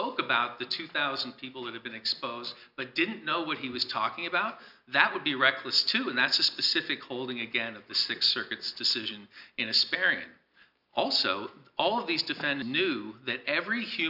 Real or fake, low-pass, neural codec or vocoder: real; 5.4 kHz; none